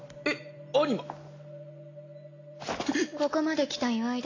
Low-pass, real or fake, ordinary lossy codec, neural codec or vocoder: 7.2 kHz; real; AAC, 32 kbps; none